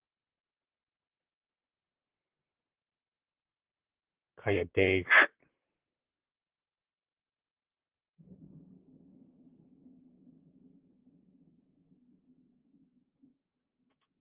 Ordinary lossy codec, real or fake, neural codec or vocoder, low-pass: Opus, 16 kbps; fake; vocoder, 44.1 kHz, 128 mel bands, Pupu-Vocoder; 3.6 kHz